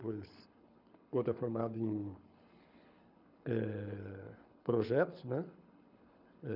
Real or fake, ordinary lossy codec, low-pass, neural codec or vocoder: fake; none; 5.4 kHz; codec, 24 kHz, 6 kbps, HILCodec